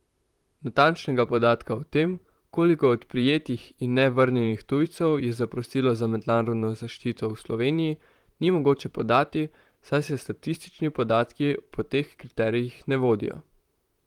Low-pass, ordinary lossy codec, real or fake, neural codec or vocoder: 19.8 kHz; Opus, 24 kbps; fake; vocoder, 44.1 kHz, 128 mel bands, Pupu-Vocoder